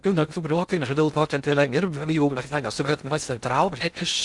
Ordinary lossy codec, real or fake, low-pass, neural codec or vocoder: Opus, 64 kbps; fake; 10.8 kHz; codec, 16 kHz in and 24 kHz out, 0.6 kbps, FocalCodec, streaming, 2048 codes